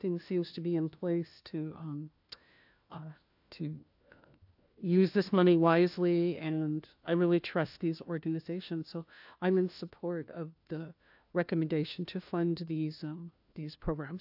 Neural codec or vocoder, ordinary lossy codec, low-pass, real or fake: codec, 16 kHz, 1 kbps, FunCodec, trained on LibriTTS, 50 frames a second; AAC, 48 kbps; 5.4 kHz; fake